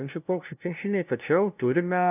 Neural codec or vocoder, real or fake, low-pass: codec, 16 kHz, 0.5 kbps, FunCodec, trained on LibriTTS, 25 frames a second; fake; 3.6 kHz